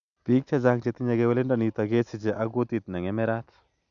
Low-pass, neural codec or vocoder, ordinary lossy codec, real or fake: 7.2 kHz; none; none; real